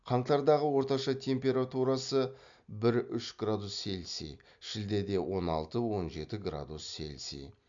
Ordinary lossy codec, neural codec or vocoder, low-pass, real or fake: MP3, 64 kbps; none; 7.2 kHz; real